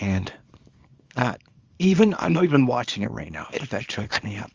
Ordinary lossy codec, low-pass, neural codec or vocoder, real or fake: Opus, 32 kbps; 7.2 kHz; codec, 24 kHz, 0.9 kbps, WavTokenizer, small release; fake